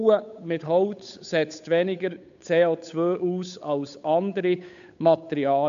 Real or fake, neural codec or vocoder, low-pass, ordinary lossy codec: fake; codec, 16 kHz, 8 kbps, FunCodec, trained on Chinese and English, 25 frames a second; 7.2 kHz; none